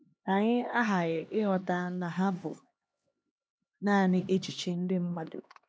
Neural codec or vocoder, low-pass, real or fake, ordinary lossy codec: codec, 16 kHz, 2 kbps, X-Codec, HuBERT features, trained on LibriSpeech; none; fake; none